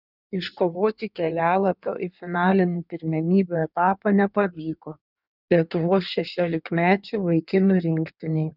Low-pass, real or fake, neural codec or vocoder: 5.4 kHz; fake; codec, 16 kHz in and 24 kHz out, 1.1 kbps, FireRedTTS-2 codec